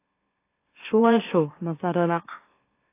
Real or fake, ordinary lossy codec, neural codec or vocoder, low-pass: fake; AAC, 24 kbps; autoencoder, 44.1 kHz, a latent of 192 numbers a frame, MeloTTS; 3.6 kHz